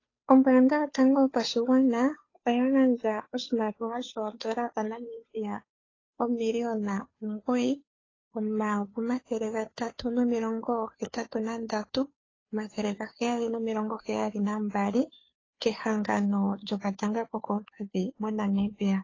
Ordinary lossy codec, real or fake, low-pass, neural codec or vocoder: AAC, 32 kbps; fake; 7.2 kHz; codec, 16 kHz, 2 kbps, FunCodec, trained on Chinese and English, 25 frames a second